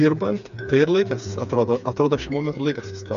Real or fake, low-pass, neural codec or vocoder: fake; 7.2 kHz; codec, 16 kHz, 4 kbps, FreqCodec, smaller model